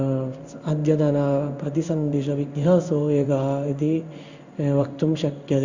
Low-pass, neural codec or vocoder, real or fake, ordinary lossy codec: 7.2 kHz; codec, 16 kHz in and 24 kHz out, 1 kbps, XY-Tokenizer; fake; Opus, 64 kbps